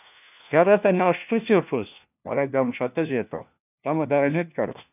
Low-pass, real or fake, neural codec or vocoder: 3.6 kHz; fake; codec, 16 kHz, 1 kbps, FunCodec, trained on LibriTTS, 50 frames a second